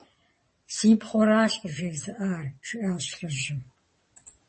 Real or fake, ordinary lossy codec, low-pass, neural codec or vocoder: fake; MP3, 32 kbps; 9.9 kHz; vocoder, 22.05 kHz, 80 mel bands, WaveNeXt